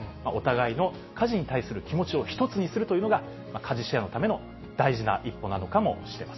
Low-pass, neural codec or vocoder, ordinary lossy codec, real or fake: 7.2 kHz; none; MP3, 24 kbps; real